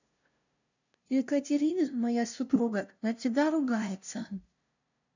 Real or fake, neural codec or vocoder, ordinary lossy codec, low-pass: fake; codec, 16 kHz, 0.5 kbps, FunCodec, trained on LibriTTS, 25 frames a second; none; 7.2 kHz